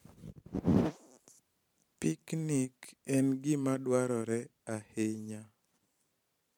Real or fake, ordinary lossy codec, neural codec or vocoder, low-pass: fake; MP3, 96 kbps; vocoder, 44.1 kHz, 128 mel bands every 512 samples, BigVGAN v2; 19.8 kHz